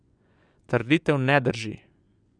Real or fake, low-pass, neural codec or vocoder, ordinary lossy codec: fake; 9.9 kHz; vocoder, 24 kHz, 100 mel bands, Vocos; none